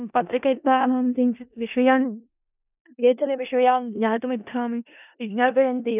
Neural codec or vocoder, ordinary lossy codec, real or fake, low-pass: codec, 16 kHz in and 24 kHz out, 0.4 kbps, LongCat-Audio-Codec, four codebook decoder; none; fake; 3.6 kHz